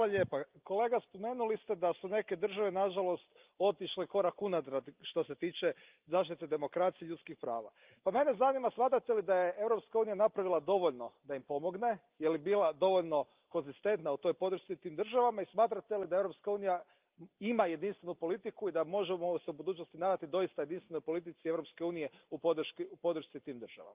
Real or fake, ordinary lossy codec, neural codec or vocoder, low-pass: real; Opus, 32 kbps; none; 3.6 kHz